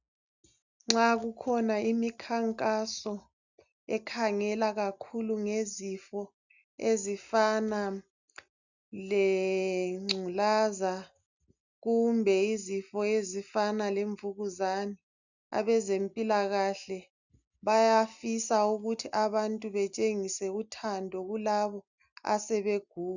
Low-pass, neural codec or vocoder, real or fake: 7.2 kHz; none; real